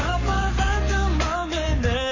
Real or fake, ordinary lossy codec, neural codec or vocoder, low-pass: fake; MP3, 32 kbps; codec, 44.1 kHz, 7.8 kbps, Pupu-Codec; 7.2 kHz